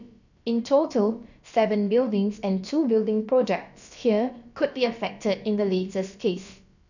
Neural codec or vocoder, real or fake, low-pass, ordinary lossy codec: codec, 16 kHz, about 1 kbps, DyCAST, with the encoder's durations; fake; 7.2 kHz; none